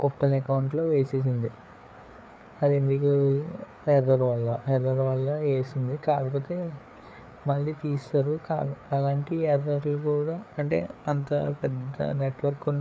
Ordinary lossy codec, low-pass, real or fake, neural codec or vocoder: none; none; fake; codec, 16 kHz, 4 kbps, FreqCodec, larger model